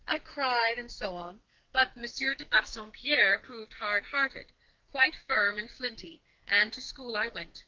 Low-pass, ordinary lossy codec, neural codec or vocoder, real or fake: 7.2 kHz; Opus, 24 kbps; codec, 44.1 kHz, 2.6 kbps, SNAC; fake